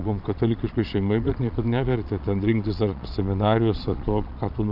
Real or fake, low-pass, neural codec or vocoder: fake; 5.4 kHz; vocoder, 22.05 kHz, 80 mel bands, Vocos